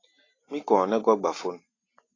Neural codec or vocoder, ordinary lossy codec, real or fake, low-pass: none; AAC, 32 kbps; real; 7.2 kHz